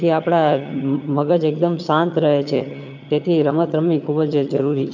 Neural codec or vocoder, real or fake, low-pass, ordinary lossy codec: vocoder, 22.05 kHz, 80 mel bands, HiFi-GAN; fake; 7.2 kHz; none